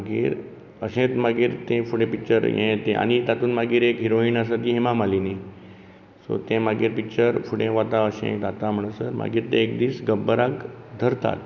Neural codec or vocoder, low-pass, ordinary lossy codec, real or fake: none; 7.2 kHz; none; real